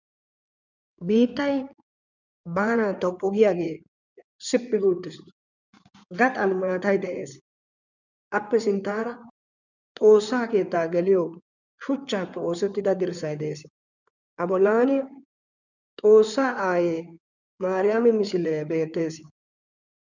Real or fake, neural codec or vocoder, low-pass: fake; codec, 16 kHz in and 24 kHz out, 2.2 kbps, FireRedTTS-2 codec; 7.2 kHz